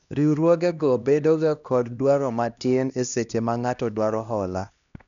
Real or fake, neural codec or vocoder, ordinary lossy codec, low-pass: fake; codec, 16 kHz, 1 kbps, X-Codec, HuBERT features, trained on LibriSpeech; none; 7.2 kHz